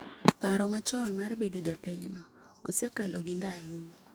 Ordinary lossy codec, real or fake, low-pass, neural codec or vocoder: none; fake; none; codec, 44.1 kHz, 2.6 kbps, DAC